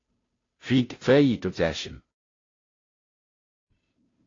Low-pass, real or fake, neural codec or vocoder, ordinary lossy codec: 7.2 kHz; fake; codec, 16 kHz, 0.5 kbps, FunCodec, trained on Chinese and English, 25 frames a second; AAC, 32 kbps